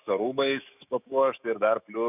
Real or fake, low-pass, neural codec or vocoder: fake; 3.6 kHz; autoencoder, 48 kHz, 128 numbers a frame, DAC-VAE, trained on Japanese speech